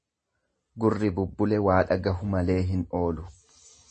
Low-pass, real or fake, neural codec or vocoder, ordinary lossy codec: 10.8 kHz; real; none; MP3, 32 kbps